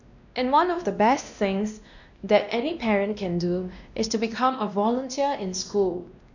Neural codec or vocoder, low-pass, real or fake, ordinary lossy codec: codec, 16 kHz, 1 kbps, X-Codec, WavLM features, trained on Multilingual LibriSpeech; 7.2 kHz; fake; none